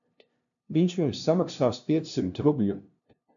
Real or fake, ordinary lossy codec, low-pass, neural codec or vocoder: fake; AAC, 64 kbps; 7.2 kHz; codec, 16 kHz, 0.5 kbps, FunCodec, trained on LibriTTS, 25 frames a second